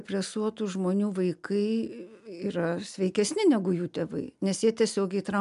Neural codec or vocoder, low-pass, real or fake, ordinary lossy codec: none; 10.8 kHz; real; AAC, 96 kbps